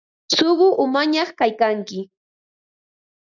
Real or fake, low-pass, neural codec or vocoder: real; 7.2 kHz; none